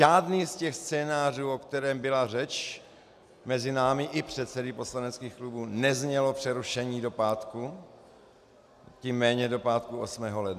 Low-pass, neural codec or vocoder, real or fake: 14.4 kHz; none; real